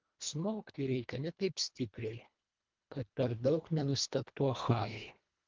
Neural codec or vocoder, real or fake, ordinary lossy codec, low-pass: codec, 24 kHz, 1.5 kbps, HILCodec; fake; Opus, 24 kbps; 7.2 kHz